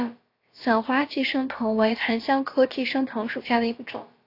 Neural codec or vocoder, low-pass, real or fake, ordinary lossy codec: codec, 16 kHz, about 1 kbps, DyCAST, with the encoder's durations; 5.4 kHz; fake; AAC, 32 kbps